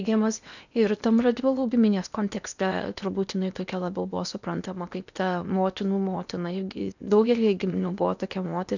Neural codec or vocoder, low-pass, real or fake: codec, 16 kHz in and 24 kHz out, 0.8 kbps, FocalCodec, streaming, 65536 codes; 7.2 kHz; fake